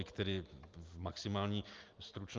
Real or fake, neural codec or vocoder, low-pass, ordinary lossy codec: real; none; 7.2 kHz; Opus, 24 kbps